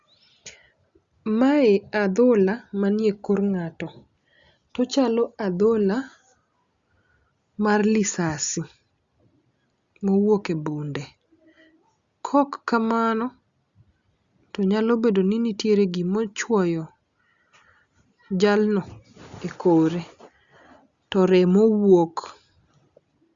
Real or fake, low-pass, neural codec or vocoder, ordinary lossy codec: real; 7.2 kHz; none; Opus, 64 kbps